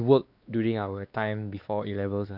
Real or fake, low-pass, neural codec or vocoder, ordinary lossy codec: fake; 5.4 kHz; codec, 16 kHz, 2 kbps, X-Codec, WavLM features, trained on Multilingual LibriSpeech; none